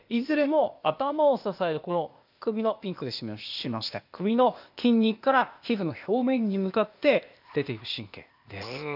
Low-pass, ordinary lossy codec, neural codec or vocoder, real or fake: 5.4 kHz; none; codec, 16 kHz, 0.8 kbps, ZipCodec; fake